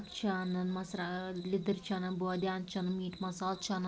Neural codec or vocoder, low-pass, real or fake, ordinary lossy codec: none; none; real; none